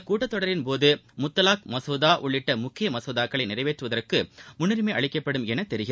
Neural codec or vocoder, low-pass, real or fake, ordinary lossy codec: none; none; real; none